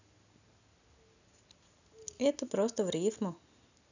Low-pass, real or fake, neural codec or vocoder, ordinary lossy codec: 7.2 kHz; real; none; none